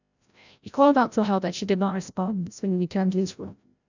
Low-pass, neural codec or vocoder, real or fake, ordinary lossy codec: 7.2 kHz; codec, 16 kHz, 0.5 kbps, FreqCodec, larger model; fake; none